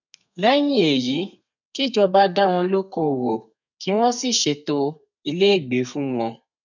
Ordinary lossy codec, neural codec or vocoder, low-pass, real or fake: none; codec, 32 kHz, 1.9 kbps, SNAC; 7.2 kHz; fake